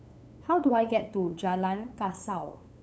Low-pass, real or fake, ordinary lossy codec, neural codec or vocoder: none; fake; none; codec, 16 kHz, 8 kbps, FunCodec, trained on LibriTTS, 25 frames a second